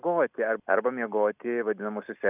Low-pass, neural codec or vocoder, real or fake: 3.6 kHz; none; real